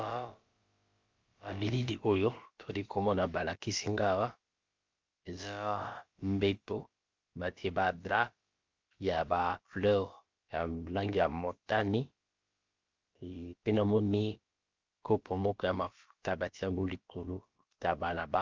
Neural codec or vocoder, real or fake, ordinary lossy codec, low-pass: codec, 16 kHz, about 1 kbps, DyCAST, with the encoder's durations; fake; Opus, 32 kbps; 7.2 kHz